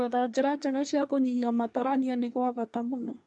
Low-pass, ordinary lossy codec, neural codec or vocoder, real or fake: 10.8 kHz; MP3, 64 kbps; codec, 24 kHz, 1 kbps, SNAC; fake